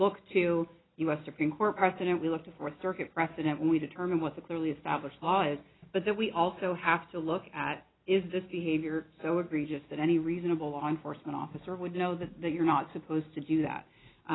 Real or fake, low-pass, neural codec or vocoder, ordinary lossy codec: real; 7.2 kHz; none; AAC, 16 kbps